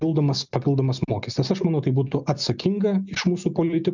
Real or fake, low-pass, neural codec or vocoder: real; 7.2 kHz; none